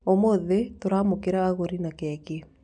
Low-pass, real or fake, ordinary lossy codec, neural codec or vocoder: 9.9 kHz; real; none; none